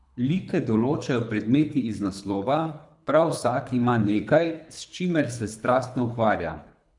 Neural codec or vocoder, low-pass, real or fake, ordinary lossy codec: codec, 24 kHz, 3 kbps, HILCodec; 10.8 kHz; fake; none